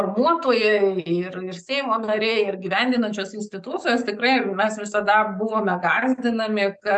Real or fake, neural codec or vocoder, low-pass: fake; codec, 44.1 kHz, 7.8 kbps, DAC; 10.8 kHz